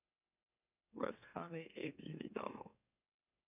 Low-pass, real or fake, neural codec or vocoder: 3.6 kHz; fake; autoencoder, 44.1 kHz, a latent of 192 numbers a frame, MeloTTS